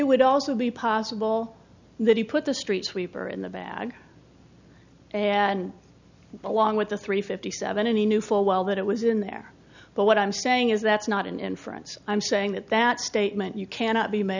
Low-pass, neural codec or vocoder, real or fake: 7.2 kHz; none; real